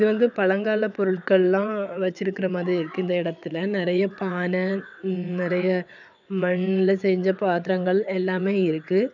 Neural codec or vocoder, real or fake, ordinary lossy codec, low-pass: vocoder, 22.05 kHz, 80 mel bands, WaveNeXt; fake; none; 7.2 kHz